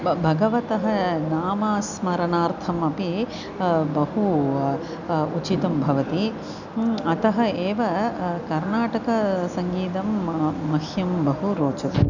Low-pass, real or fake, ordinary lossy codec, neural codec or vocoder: 7.2 kHz; real; none; none